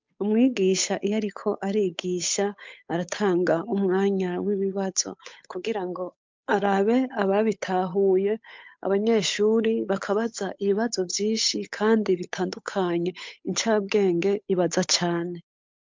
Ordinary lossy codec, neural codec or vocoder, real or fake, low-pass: MP3, 64 kbps; codec, 16 kHz, 8 kbps, FunCodec, trained on Chinese and English, 25 frames a second; fake; 7.2 kHz